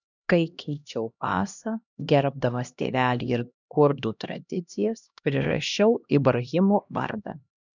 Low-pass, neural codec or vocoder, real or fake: 7.2 kHz; codec, 16 kHz, 1 kbps, X-Codec, HuBERT features, trained on LibriSpeech; fake